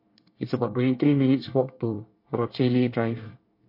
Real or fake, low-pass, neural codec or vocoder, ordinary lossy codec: fake; 5.4 kHz; codec, 24 kHz, 1 kbps, SNAC; MP3, 32 kbps